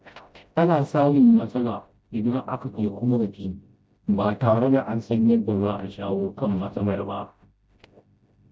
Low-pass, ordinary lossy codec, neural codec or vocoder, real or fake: none; none; codec, 16 kHz, 0.5 kbps, FreqCodec, smaller model; fake